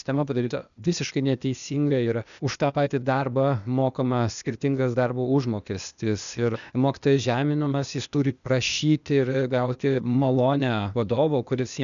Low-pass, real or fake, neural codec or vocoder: 7.2 kHz; fake; codec, 16 kHz, 0.8 kbps, ZipCodec